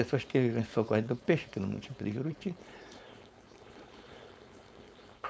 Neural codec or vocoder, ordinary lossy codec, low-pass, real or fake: codec, 16 kHz, 4.8 kbps, FACodec; none; none; fake